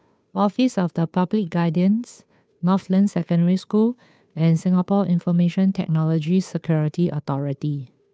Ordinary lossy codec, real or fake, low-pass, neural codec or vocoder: none; fake; none; codec, 16 kHz, 2 kbps, FunCodec, trained on Chinese and English, 25 frames a second